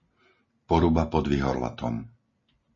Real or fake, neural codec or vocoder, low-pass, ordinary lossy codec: real; none; 7.2 kHz; MP3, 32 kbps